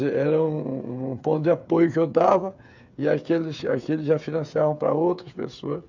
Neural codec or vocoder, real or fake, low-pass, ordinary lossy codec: codec, 16 kHz, 8 kbps, FreqCodec, smaller model; fake; 7.2 kHz; none